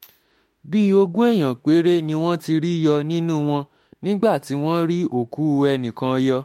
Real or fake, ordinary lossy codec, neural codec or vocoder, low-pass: fake; MP3, 64 kbps; autoencoder, 48 kHz, 32 numbers a frame, DAC-VAE, trained on Japanese speech; 19.8 kHz